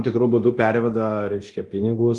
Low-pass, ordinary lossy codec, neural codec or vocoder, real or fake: 10.8 kHz; Opus, 24 kbps; codec, 24 kHz, 0.9 kbps, DualCodec; fake